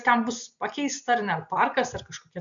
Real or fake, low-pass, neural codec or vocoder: real; 7.2 kHz; none